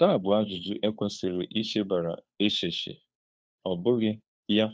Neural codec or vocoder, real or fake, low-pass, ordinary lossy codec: codec, 16 kHz, 2 kbps, FunCodec, trained on Chinese and English, 25 frames a second; fake; none; none